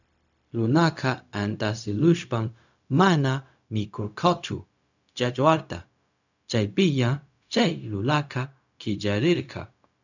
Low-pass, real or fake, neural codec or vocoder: 7.2 kHz; fake; codec, 16 kHz, 0.4 kbps, LongCat-Audio-Codec